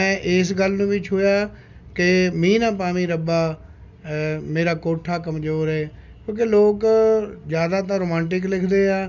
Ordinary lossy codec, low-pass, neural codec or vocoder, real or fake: none; 7.2 kHz; none; real